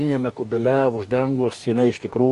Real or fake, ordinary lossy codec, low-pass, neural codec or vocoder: fake; MP3, 48 kbps; 14.4 kHz; codec, 44.1 kHz, 2.6 kbps, DAC